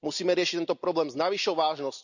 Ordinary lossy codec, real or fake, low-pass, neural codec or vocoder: none; real; 7.2 kHz; none